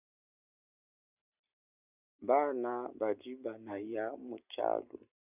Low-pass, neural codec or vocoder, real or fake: 3.6 kHz; none; real